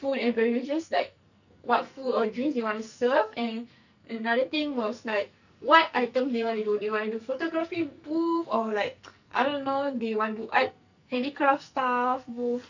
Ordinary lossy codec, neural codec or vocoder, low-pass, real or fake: none; codec, 44.1 kHz, 2.6 kbps, SNAC; 7.2 kHz; fake